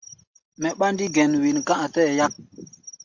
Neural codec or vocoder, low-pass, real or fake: none; 7.2 kHz; real